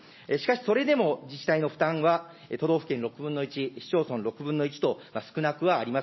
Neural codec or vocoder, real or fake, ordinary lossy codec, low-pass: none; real; MP3, 24 kbps; 7.2 kHz